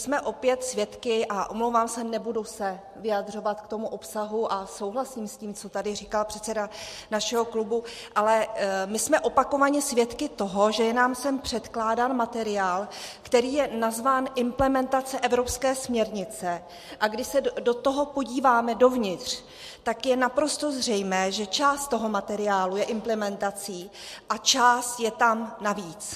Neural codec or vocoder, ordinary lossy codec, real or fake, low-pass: none; MP3, 64 kbps; real; 14.4 kHz